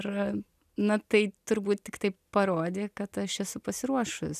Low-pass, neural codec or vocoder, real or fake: 14.4 kHz; none; real